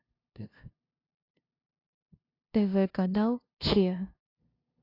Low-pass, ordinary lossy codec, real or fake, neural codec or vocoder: 5.4 kHz; AAC, 32 kbps; fake; codec, 16 kHz, 0.5 kbps, FunCodec, trained on LibriTTS, 25 frames a second